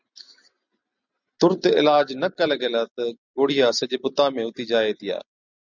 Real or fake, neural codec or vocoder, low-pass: real; none; 7.2 kHz